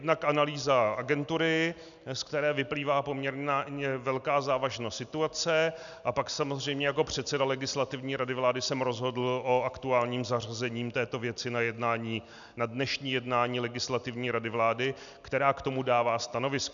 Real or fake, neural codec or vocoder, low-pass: real; none; 7.2 kHz